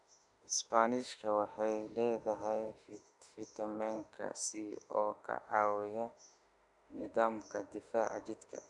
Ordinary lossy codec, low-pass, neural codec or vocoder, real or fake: none; 10.8 kHz; autoencoder, 48 kHz, 32 numbers a frame, DAC-VAE, trained on Japanese speech; fake